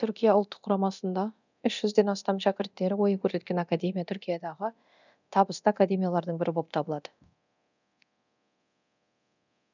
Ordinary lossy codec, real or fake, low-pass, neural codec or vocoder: none; fake; 7.2 kHz; codec, 24 kHz, 0.9 kbps, DualCodec